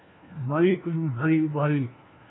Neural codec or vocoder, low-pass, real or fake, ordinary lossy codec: codec, 16 kHz, 1 kbps, FunCodec, trained on LibriTTS, 50 frames a second; 7.2 kHz; fake; AAC, 16 kbps